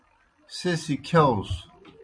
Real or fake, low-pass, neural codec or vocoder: real; 9.9 kHz; none